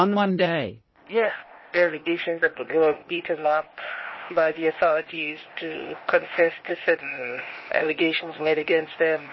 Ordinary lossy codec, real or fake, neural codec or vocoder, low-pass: MP3, 24 kbps; fake; codec, 16 kHz, 0.8 kbps, ZipCodec; 7.2 kHz